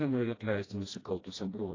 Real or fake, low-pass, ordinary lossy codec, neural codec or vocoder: fake; 7.2 kHz; AAC, 32 kbps; codec, 16 kHz, 1 kbps, FreqCodec, smaller model